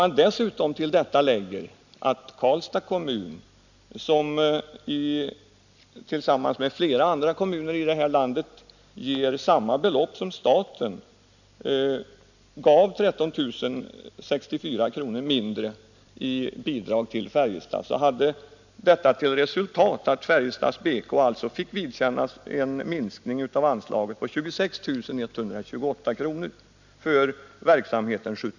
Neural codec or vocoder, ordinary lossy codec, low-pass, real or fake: none; none; 7.2 kHz; real